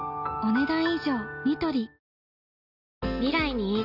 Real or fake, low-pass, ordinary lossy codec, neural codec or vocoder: real; 5.4 kHz; none; none